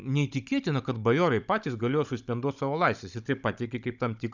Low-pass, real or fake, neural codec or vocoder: 7.2 kHz; fake; codec, 16 kHz, 16 kbps, FunCodec, trained on Chinese and English, 50 frames a second